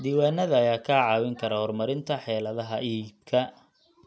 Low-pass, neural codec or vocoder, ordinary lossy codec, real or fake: none; none; none; real